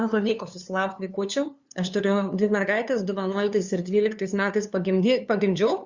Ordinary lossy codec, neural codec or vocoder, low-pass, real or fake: Opus, 64 kbps; codec, 16 kHz, 2 kbps, FunCodec, trained on LibriTTS, 25 frames a second; 7.2 kHz; fake